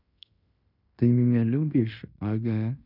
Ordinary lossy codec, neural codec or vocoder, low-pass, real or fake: none; codec, 16 kHz in and 24 kHz out, 0.9 kbps, LongCat-Audio-Codec, fine tuned four codebook decoder; 5.4 kHz; fake